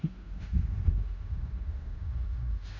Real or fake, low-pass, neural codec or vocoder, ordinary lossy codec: fake; 7.2 kHz; codec, 16 kHz in and 24 kHz out, 0.9 kbps, LongCat-Audio-Codec, fine tuned four codebook decoder; none